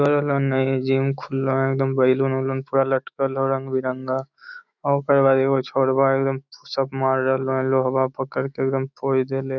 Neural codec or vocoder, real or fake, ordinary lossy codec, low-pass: autoencoder, 48 kHz, 128 numbers a frame, DAC-VAE, trained on Japanese speech; fake; none; 7.2 kHz